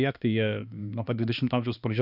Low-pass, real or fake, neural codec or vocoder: 5.4 kHz; fake; codec, 16 kHz, 2 kbps, X-Codec, HuBERT features, trained on balanced general audio